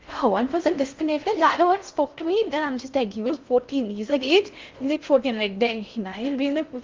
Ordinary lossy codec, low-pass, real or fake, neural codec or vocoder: Opus, 24 kbps; 7.2 kHz; fake; codec, 16 kHz in and 24 kHz out, 0.6 kbps, FocalCodec, streaming, 4096 codes